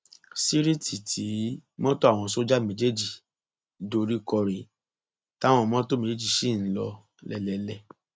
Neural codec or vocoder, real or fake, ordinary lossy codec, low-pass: none; real; none; none